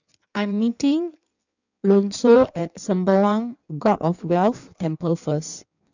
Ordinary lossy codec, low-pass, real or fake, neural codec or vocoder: none; 7.2 kHz; fake; codec, 16 kHz in and 24 kHz out, 1.1 kbps, FireRedTTS-2 codec